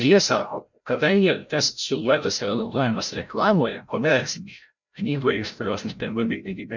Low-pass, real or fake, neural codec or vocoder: 7.2 kHz; fake; codec, 16 kHz, 0.5 kbps, FreqCodec, larger model